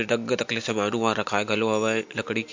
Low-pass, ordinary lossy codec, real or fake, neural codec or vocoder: 7.2 kHz; MP3, 48 kbps; real; none